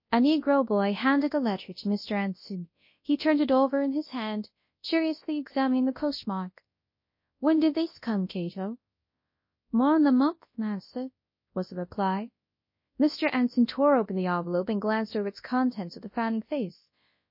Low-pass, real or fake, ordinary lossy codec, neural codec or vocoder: 5.4 kHz; fake; MP3, 32 kbps; codec, 24 kHz, 0.9 kbps, WavTokenizer, large speech release